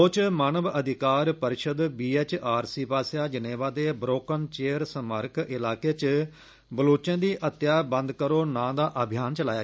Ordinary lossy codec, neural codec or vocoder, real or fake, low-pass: none; none; real; none